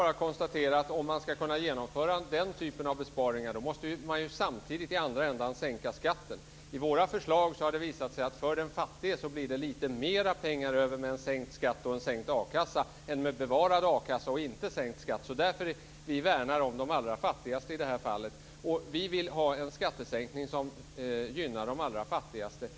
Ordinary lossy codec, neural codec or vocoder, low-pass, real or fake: none; none; none; real